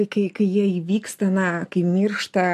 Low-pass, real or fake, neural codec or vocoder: 14.4 kHz; real; none